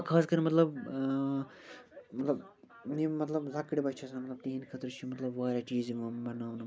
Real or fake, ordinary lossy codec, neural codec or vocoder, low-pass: real; none; none; none